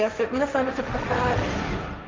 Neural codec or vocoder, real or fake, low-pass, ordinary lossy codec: codec, 16 kHz, 1.1 kbps, Voila-Tokenizer; fake; 7.2 kHz; Opus, 24 kbps